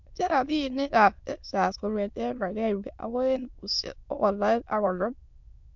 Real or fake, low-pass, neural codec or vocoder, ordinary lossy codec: fake; 7.2 kHz; autoencoder, 22.05 kHz, a latent of 192 numbers a frame, VITS, trained on many speakers; MP3, 64 kbps